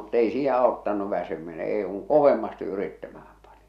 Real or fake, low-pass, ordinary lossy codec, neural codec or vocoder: real; 14.4 kHz; none; none